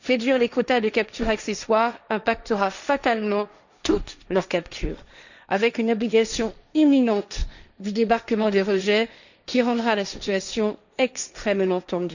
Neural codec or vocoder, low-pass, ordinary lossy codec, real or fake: codec, 16 kHz, 1.1 kbps, Voila-Tokenizer; 7.2 kHz; none; fake